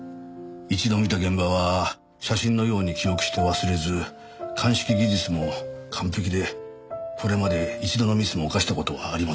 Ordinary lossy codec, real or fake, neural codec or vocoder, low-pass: none; real; none; none